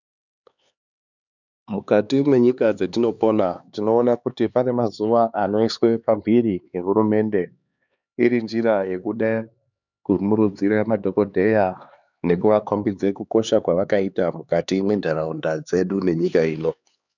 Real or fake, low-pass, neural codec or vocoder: fake; 7.2 kHz; codec, 16 kHz, 4 kbps, X-Codec, HuBERT features, trained on LibriSpeech